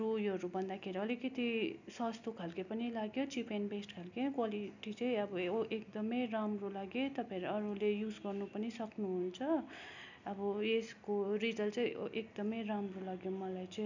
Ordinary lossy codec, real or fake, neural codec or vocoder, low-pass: none; real; none; 7.2 kHz